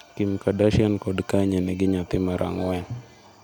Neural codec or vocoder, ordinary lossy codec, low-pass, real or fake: none; none; none; real